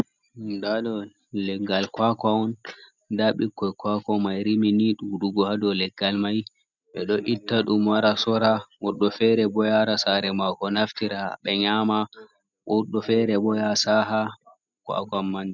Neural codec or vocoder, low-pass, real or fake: none; 7.2 kHz; real